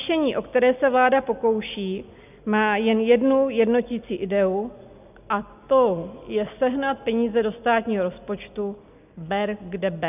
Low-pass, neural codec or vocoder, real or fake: 3.6 kHz; none; real